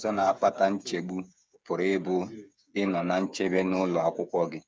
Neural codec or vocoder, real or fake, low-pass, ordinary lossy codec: codec, 16 kHz, 8 kbps, FreqCodec, smaller model; fake; none; none